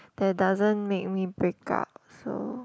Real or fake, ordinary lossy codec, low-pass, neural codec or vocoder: real; none; none; none